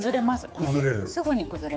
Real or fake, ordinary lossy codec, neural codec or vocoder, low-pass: fake; none; codec, 16 kHz, 4 kbps, X-Codec, HuBERT features, trained on general audio; none